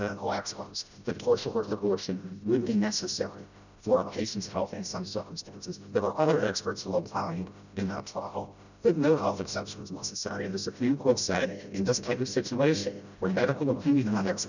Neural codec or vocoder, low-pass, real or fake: codec, 16 kHz, 0.5 kbps, FreqCodec, smaller model; 7.2 kHz; fake